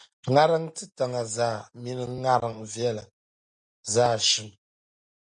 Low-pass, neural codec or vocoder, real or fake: 9.9 kHz; none; real